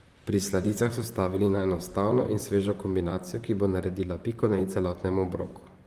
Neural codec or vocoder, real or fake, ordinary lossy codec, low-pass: vocoder, 44.1 kHz, 128 mel bands, Pupu-Vocoder; fake; Opus, 32 kbps; 14.4 kHz